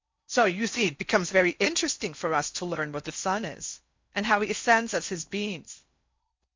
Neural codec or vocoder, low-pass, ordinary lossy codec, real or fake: codec, 16 kHz in and 24 kHz out, 0.6 kbps, FocalCodec, streaming, 4096 codes; 7.2 kHz; MP3, 64 kbps; fake